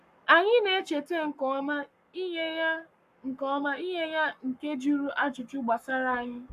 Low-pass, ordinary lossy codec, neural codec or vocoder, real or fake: 14.4 kHz; none; codec, 44.1 kHz, 7.8 kbps, Pupu-Codec; fake